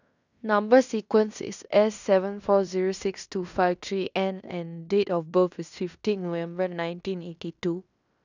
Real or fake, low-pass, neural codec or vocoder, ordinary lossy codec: fake; 7.2 kHz; codec, 16 kHz in and 24 kHz out, 0.9 kbps, LongCat-Audio-Codec, fine tuned four codebook decoder; none